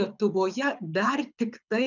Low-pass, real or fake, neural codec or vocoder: 7.2 kHz; fake; codec, 24 kHz, 6 kbps, HILCodec